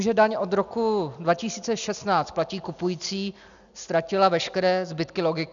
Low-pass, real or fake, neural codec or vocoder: 7.2 kHz; real; none